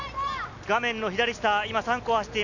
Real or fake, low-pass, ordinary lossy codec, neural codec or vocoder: real; 7.2 kHz; none; none